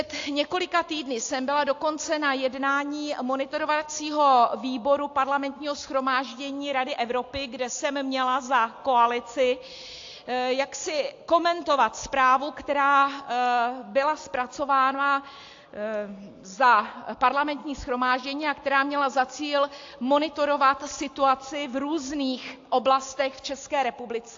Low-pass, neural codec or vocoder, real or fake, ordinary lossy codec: 7.2 kHz; none; real; AAC, 48 kbps